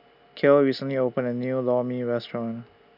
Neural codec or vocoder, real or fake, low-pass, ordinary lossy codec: none; real; 5.4 kHz; none